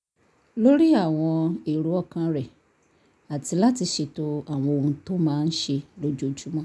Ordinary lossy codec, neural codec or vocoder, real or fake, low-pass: none; none; real; none